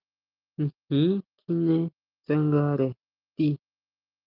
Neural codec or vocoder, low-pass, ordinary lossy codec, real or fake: none; 5.4 kHz; Opus, 24 kbps; real